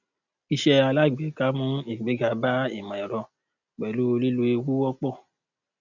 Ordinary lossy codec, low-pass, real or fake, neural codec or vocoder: none; 7.2 kHz; real; none